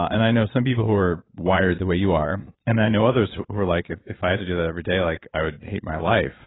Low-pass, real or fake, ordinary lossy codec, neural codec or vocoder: 7.2 kHz; fake; AAC, 16 kbps; vocoder, 22.05 kHz, 80 mel bands, Vocos